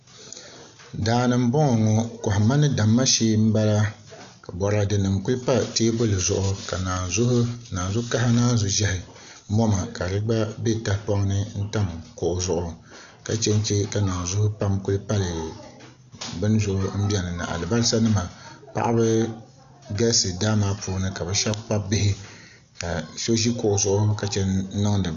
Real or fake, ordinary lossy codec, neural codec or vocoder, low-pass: real; AAC, 96 kbps; none; 7.2 kHz